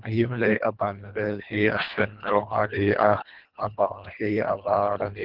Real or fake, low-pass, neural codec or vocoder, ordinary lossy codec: fake; 5.4 kHz; codec, 24 kHz, 1.5 kbps, HILCodec; Opus, 32 kbps